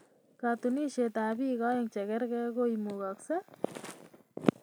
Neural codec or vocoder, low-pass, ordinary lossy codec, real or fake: none; none; none; real